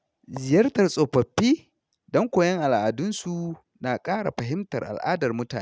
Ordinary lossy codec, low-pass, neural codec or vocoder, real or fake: none; none; none; real